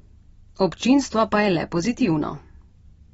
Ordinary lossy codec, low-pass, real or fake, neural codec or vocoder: AAC, 24 kbps; 19.8 kHz; real; none